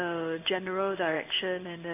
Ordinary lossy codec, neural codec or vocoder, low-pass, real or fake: AAC, 24 kbps; codec, 16 kHz in and 24 kHz out, 1 kbps, XY-Tokenizer; 3.6 kHz; fake